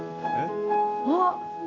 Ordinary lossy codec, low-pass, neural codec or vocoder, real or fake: none; 7.2 kHz; none; real